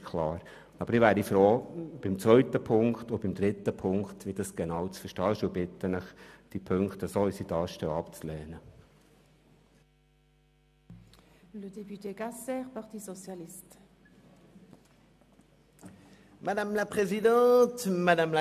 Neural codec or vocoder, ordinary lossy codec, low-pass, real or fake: none; MP3, 96 kbps; 14.4 kHz; real